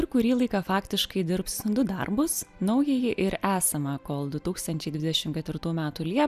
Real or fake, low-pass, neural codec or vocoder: real; 14.4 kHz; none